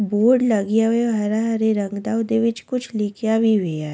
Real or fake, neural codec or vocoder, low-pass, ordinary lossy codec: real; none; none; none